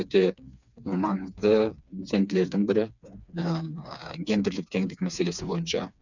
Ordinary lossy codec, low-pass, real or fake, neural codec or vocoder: none; 7.2 kHz; fake; codec, 16 kHz, 4 kbps, FreqCodec, smaller model